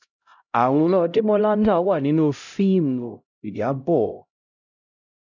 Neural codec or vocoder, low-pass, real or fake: codec, 16 kHz, 0.5 kbps, X-Codec, HuBERT features, trained on LibriSpeech; 7.2 kHz; fake